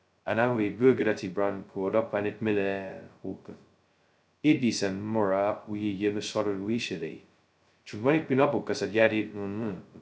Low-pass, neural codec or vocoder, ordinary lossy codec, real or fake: none; codec, 16 kHz, 0.2 kbps, FocalCodec; none; fake